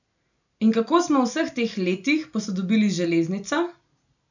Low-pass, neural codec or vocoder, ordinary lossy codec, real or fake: 7.2 kHz; none; none; real